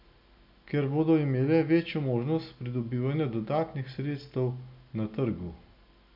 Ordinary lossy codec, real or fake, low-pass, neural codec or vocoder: none; real; 5.4 kHz; none